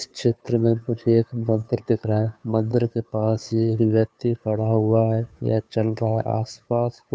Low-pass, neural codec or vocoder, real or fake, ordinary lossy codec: none; codec, 16 kHz, 2 kbps, FunCodec, trained on Chinese and English, 25 frames a second; fake; none